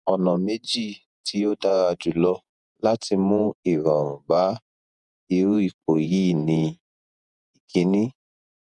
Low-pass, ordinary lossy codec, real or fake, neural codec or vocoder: 10.8 kHz; none; fake; vocoder, 48 kHz, 128 mel bands, Vocos